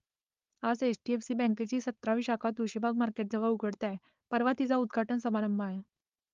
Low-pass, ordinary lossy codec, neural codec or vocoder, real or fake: 7.2 kHz; Opus, 32 kbps; codec, 16 kHz, 4.8 kbps, FACodec; fake